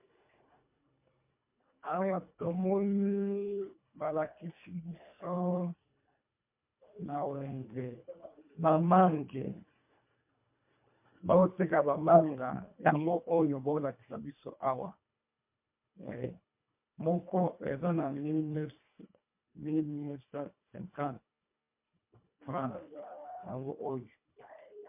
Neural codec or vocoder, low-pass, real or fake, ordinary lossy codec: codec, 24 kHz, 1.5 kbps, HILCodec; 3.6 kHz; fake; MP3, 32 kbps